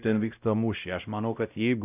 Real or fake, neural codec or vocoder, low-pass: fake; codec, 16 kHz, 0.5 kbps, X-Codec, WavLM features, trained on Multilingual LibriSpeech; 3.6 kHz